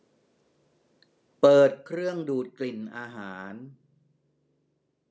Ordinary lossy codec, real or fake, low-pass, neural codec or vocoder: none; real; none; none